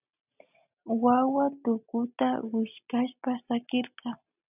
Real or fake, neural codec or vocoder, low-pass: real; none; 3.6 kHz